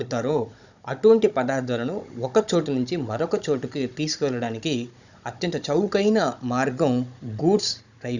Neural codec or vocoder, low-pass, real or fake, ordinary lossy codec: codec, 16 kHz, 16 kbps, FunCodec, trained on Chinese and English, 50 frames a second; 7.2 kHz; fake; none